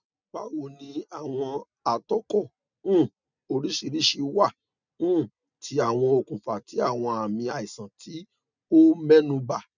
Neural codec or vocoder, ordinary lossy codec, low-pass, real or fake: none; none; 7.2 kHz; real